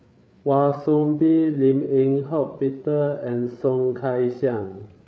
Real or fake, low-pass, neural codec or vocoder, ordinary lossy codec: fake; none; codec, 16 kHz, 8 kbps, FreqCodec, larger model; none